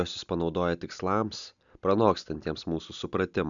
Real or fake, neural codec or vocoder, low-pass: real; none; 7.2 kHz